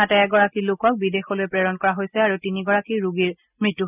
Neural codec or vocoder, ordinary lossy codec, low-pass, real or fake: none; none; 3.6 kHz; real